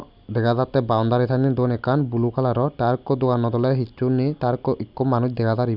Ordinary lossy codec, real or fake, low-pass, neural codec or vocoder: none; real; 5.4 kHz; none